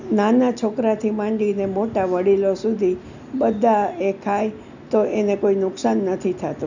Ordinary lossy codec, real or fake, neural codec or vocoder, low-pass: none; real; none; 7.2 kHz